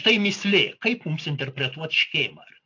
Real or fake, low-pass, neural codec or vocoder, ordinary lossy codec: real; 7.2 kHz; none; AAC, 48 kbps